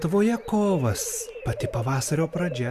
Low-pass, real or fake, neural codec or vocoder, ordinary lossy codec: 14.4 kHz; real; none; Opus, 64 kbps